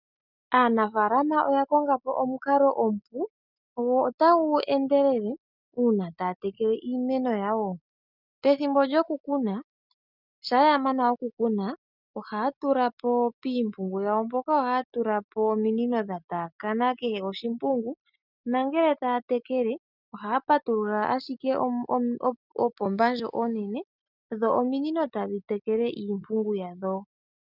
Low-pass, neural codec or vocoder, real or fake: 5.4 kHz; none; real